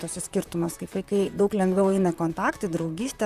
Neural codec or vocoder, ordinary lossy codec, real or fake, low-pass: vocoder, 44.1 kHz, 128 mel bands, Pupu-Vocoder; Opus, 64 kbps; fake; 14.4 kHz